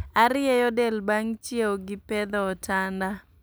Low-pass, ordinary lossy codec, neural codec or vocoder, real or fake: none; none; none; real